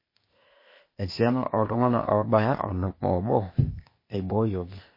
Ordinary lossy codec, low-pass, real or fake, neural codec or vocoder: MP3, 24 kbps; 5.4 kHz; fake; codec, 16 kHz, 0.8 kbps, ZipCodec